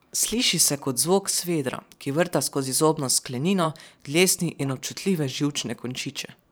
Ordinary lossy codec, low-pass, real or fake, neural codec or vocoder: none; none; fake; vocoder, 44.1 kHz, 128 mel bands every 512 samples, BigVGAN v2